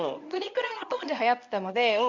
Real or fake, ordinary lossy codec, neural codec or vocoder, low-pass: fake; none; codec, 24 kHz, 0.9 kbps, WavTokenizer, medium speech release version 1; 7.2 kHz